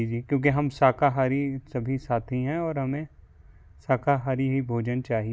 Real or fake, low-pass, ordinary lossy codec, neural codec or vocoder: real; none; none; none